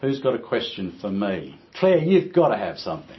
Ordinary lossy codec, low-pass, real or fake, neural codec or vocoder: MP3, 24 kbps; 7.2 kHz; real; none